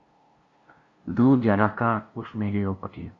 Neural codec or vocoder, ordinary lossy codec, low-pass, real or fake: codec, 16 kHz, 0.5 kbps, FunCodec, trained on LibriTTS, 25 frames a second; Opus, 64 kbps; 7.2 kHz; fake